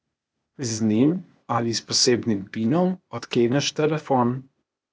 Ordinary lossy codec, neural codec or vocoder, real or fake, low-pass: none; codec, 16 kHz, 0.8 kbps, ZipCodec; fake; none